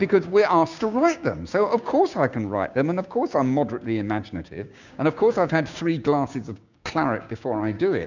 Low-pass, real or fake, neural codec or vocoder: 7.2 kHz; fake; codec, 16 kHz, 6 kbps, DAC